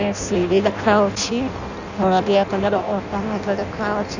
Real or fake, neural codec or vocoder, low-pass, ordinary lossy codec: fake; codec, 16 kHz in and 24 kHz out, 0.6 kbps, FireRedTTS-2 codec; 7.2 kHz; none